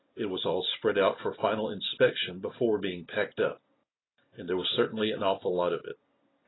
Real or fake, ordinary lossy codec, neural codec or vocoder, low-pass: real; AAC, 16 kbps; none; 7.2 kHz